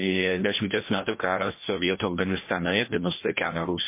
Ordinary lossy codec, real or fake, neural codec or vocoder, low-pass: MP3, 24 kbps; fake; codec, 16 kHz, 1 kbps, FreqCodec, larger model; 3.6 kHz